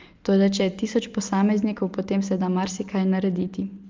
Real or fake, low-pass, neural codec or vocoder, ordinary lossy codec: real; 7.2 kHz; none; Opus, 32 kbps